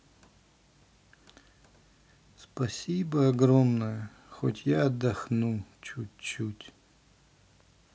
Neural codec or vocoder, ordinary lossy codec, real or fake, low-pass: none; none; real; none